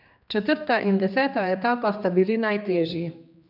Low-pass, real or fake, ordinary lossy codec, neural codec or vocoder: 5.4 kHz; fake; none; codec, 16 kHz, 2 kbps, X-Codec, HuBERT features, trained on general audio